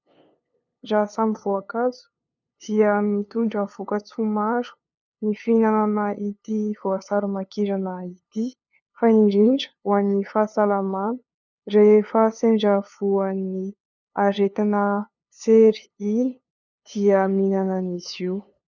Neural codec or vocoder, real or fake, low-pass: codec, 16 kHz, 2 kbps, FunCodec, trained on LibriTTS, 25 frames a second; fake; 7.2 kHz